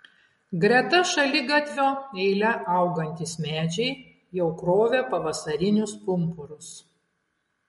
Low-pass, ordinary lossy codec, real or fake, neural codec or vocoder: 14.4 kHz; MP3, 48 kbps; real; none